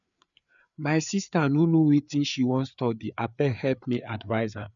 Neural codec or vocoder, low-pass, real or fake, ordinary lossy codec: codec, 16 kHz, 4 kbps, FreqCodec, larger model; 7.2 kHz; fake; none